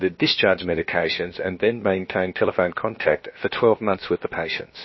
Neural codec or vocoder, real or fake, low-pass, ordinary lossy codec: codec, 16 kHz, about 1 kbps, DyCAST, with the encoder's durations; fake; 7.2 kHz; MP3, 24 kbps